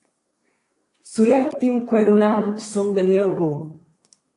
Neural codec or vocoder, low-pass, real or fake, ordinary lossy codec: codec, 24 kHz, 1 kbps, SNAC; 10.8 kHz; fake; AAC, 48 kbps